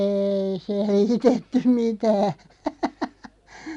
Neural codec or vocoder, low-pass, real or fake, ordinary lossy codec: none; 10.8 kHz; real; none